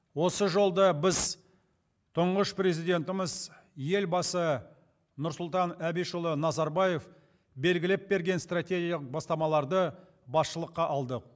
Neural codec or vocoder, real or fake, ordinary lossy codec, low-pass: none; real; none; none